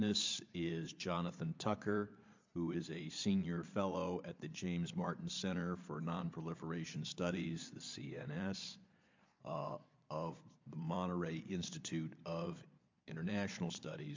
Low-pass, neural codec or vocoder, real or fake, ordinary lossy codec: 7.2 kHz; vocoder, 22.05 kHz, 80 mel bands, Vocos; fake; MP3, 64 kbps